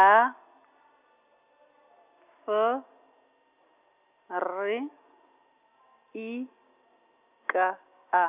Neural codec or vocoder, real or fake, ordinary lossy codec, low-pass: none; real; none; 3.6 kHz